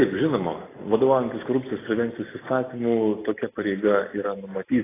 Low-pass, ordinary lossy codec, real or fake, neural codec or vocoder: 3.6 kHz; AAC, 16 kbps; real; none